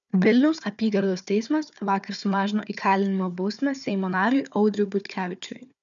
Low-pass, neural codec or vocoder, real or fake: 7.2 kHz; codec, 16 kHz, 4 kbps, FunCodec, trained on Chinese and English, 50 frames a second; fake